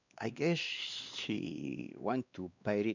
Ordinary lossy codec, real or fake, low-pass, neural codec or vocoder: none; fake; 7.2 kHz; codec, 16 kHz, 2 kbps, X-Codec, WavLM features, trained on Multilingual LibriSpeech